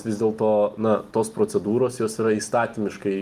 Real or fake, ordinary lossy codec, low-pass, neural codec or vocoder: real; Opus, 32 kbps; 14.4 kHz; none